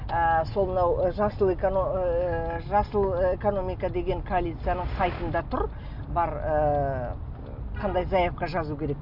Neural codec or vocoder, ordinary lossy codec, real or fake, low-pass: none; none; real; 5.4 kHz